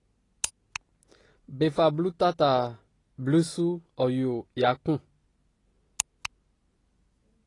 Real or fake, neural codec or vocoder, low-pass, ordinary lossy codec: real; none; 10.8 kHz; AAC, 32 kbps